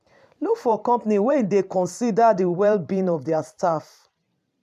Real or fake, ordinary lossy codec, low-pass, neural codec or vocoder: fake; MP3, 96 kbps; 14.4 kHz; vocoder, 44.1 kHz, 128 mel bands every 512 samples, BigVGAN v2